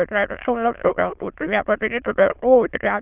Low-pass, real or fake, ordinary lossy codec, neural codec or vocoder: 3.6 kHz; fake; Opus, 32 kbps; autoencoder, 22.05 kHz, a latent of 192 numbers a frame, VITS, trained on many speakers